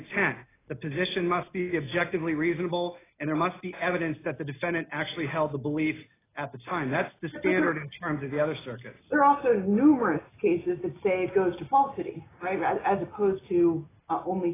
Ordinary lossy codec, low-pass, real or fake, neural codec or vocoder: AAC, 16 kbps; 3.6 kHz; real; none